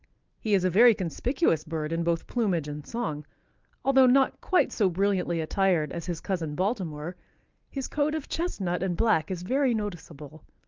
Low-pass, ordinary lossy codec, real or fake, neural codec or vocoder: 7.2 kHz; Opus, 24 kbps; real; none